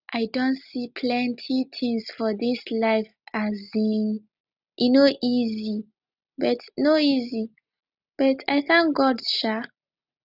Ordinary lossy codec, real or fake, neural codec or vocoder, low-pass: none; real; none; 5.4 kHz